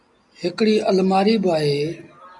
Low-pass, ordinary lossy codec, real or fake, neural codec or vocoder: 10.8 kHz; AAC, 64 kbps; real; none